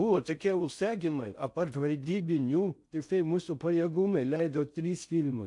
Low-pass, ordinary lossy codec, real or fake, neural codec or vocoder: 10.8 kHz; AAC, 64 kbps; fake; codec, 16 kHz in and 24 kHz out, 0.6 kbps, FocalCodec, streaming, 4096 codes